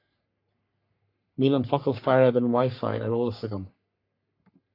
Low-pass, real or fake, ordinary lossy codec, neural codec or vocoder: 5.4 kHz; fake; AAC, 32 kbps; codec, 44.1 kHz, 3.4 kbps, Pupu-Codec